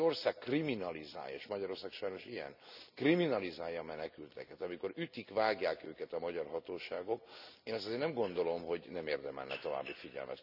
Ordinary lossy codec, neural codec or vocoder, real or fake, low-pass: none; none; real; 5.4 kHz